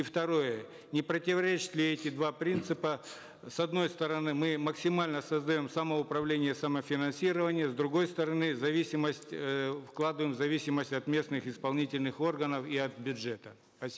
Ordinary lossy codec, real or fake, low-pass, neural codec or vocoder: none; real; none; none